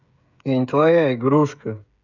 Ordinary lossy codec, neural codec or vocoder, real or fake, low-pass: none; codec, 16 kHz, 8 kbps, FreqCodec, smaller model; fake; 7.2 kHz